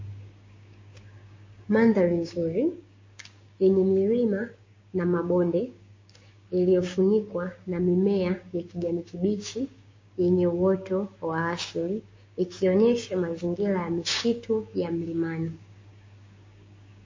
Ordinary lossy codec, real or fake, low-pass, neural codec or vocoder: MP3, 32 kbps; fake; 7.2 kHz; codec, 16 kHz, 6 kbps, DAC